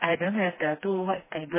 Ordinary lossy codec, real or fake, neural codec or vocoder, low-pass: MP3, 16 kbps; fake; codec, 16 kHz, 2 kbps, FreqCodec, smaller model; 3.6 kHz